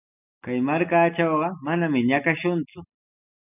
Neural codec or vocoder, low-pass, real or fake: none; 3.6 kHz; real